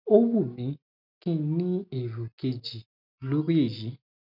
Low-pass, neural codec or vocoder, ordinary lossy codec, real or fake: 5.4 kHz; none; none; real